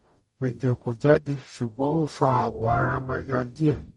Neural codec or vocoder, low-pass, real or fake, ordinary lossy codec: codec, 44.1 kHz, 0.9 kbps, DAC; 19.8 kHz; fake; MP3, 48 kbps